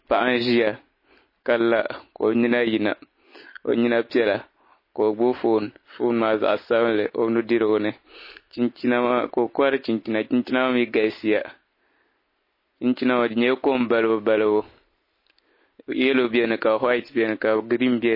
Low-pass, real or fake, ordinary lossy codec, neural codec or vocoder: 5.4 kHz; real; MP3, 24 kbps; none